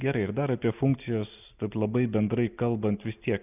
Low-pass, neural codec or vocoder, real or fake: 3.6 kHz; none; real